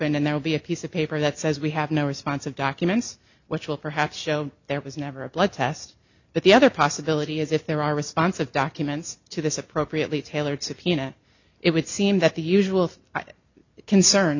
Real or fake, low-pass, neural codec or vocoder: real; 7.2 kHz; none